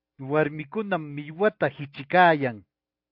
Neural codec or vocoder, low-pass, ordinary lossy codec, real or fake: none; 5.4 kHz; AAC, 48 kbps; real